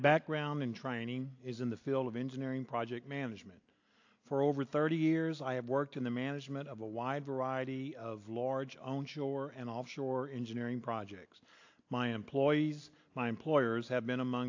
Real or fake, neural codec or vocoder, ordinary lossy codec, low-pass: real; none; AAC, 48 kbps; 7.2 kHz